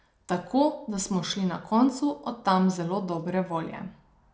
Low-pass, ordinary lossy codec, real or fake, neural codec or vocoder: none; none; real; none